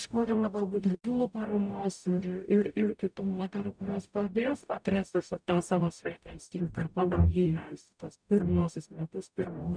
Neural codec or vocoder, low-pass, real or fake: codec, 44.1 kHz, 0.9 kbps, DAC; 9.9 kHz; fake